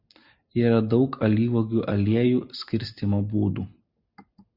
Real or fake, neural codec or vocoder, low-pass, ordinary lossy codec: real; none; 5.4 kHz; Opus, 64 kbps